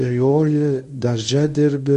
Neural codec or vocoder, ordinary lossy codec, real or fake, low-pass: codec, 24 kHz, 0.9 kbps, WavTokenizer, medium speech release version 1; AAC, 96 kbps; fake; 10.8 kHz